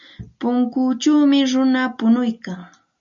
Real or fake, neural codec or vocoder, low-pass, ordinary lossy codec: real; none; 7.2 kHz; MP3, 64 kbps